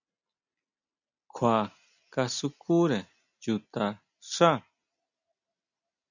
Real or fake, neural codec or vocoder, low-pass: real; none; 7.2 kHz